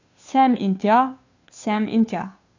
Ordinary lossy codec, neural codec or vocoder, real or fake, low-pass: AAC, 48 kbps; codec, 16 kHz, 2 kbps, FunCodec, trained on Chinese and English, 25 frames a second; fake; 7.2 kHz